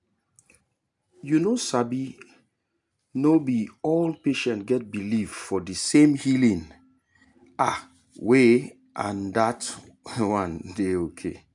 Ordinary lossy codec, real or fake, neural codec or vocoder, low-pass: none; real; none; 10.8 kHz